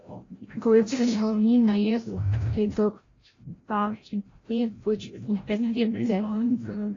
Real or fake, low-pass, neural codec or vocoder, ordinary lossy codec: fake; 7.2 kHz; codec, 16 kHz, 0.5 kbps, FreqCodec, larger model; AAC, 32 kbps